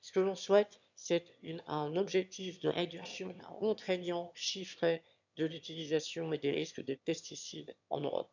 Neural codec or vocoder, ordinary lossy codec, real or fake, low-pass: autoencoder, 22.05 kHz, a latent of 192 numbers a frame, VITS, trained on one speaker; none; fake; 7.2 kHz